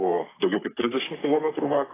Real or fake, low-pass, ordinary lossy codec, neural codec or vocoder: fake; 3.6 kHz; AAC, 16 kbps; codec, 44.1 kHz, 3.4 kbps, Pupu-Codec